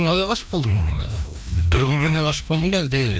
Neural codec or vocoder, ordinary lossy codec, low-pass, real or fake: codec, 16 kHz, 1 kbps, FreqCodec, larger model; none; none; fake